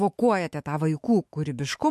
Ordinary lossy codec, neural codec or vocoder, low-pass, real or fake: MP3, 64 kbps; autoencoder, 48 kHz, 128 numbers a frame, DAC-VAE, trained on Japanese speech; 14.4 kHz; fake